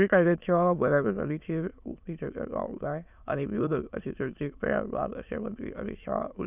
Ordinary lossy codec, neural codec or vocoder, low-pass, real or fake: none; autoencoder, 22.05 kHz, a latent of 192 numbers a frame, VITS, trained on many speakers; 3.6 kHz; fake